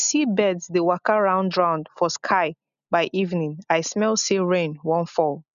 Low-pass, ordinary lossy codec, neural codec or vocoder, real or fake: 7.2 kHz; none; none; real